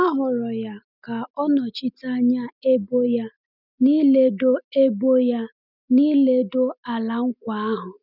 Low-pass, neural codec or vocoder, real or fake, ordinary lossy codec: 5.4 kHz; none; real; none